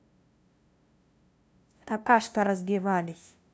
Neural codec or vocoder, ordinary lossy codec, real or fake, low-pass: codec, 16 kHz, 0.5 kbps, FunCodec, trained on LibriTTS, 25 frames a second; none; fake; none